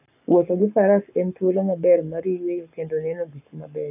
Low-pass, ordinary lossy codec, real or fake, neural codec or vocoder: 3.6 kHz; none; fake; codec, 44.1 kHz, 7.8 kbps, Pupu-Codec